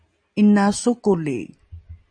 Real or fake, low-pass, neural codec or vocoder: real; 9.9 kHz; none